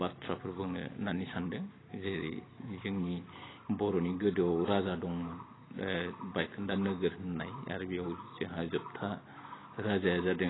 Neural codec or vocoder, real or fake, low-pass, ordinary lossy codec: none; real; 7.2 kHz; AAC, 16 kbps